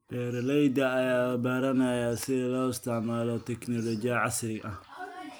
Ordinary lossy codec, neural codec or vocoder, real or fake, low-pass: none; none; real; none